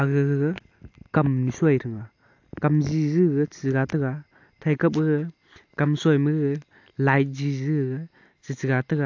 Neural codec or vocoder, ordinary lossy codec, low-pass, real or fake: none; AAC, 48 kbps; 7.2 kHz; real